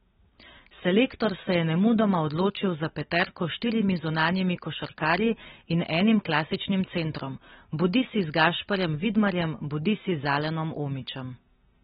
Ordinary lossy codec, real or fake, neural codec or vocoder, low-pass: AAC, 16 kbps; real; none; 7.2 kHz